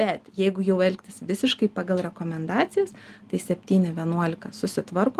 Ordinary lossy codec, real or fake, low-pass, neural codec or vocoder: Opus, 24 kbps; real; 14.4 kHz; none